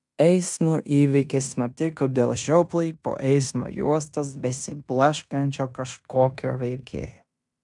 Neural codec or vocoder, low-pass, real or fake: codec, 16 kHz in and 24 kHz out, 0.9 kbps, LongCat-Audio-Codec, fine tuned four codebook decoder; 10.8 kHz; fake